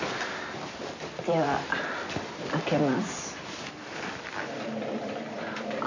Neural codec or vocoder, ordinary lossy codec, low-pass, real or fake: vocoder, 44.1 kHz, 128 mel bands every 256 samples, BigVGAN v2; AAC, 48 kbps; 7.2 kHz; fake